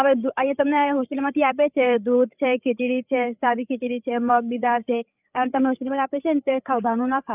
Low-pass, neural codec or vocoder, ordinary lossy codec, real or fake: 3.6 kHz; codec, 16 kHz, 8 kbps, FreqCodec, larger model; none; fake